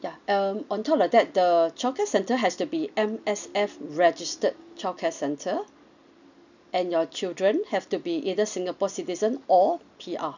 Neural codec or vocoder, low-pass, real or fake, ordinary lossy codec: none; 7.2 kHz; real; none